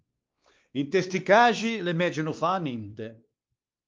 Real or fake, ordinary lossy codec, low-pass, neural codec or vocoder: fake; Opus, 24 kbps; 7.2 kHz; codec, 16 kHz, 2 kbps, X-Codec, WavLM features, trained on Multilingual LibriSpeech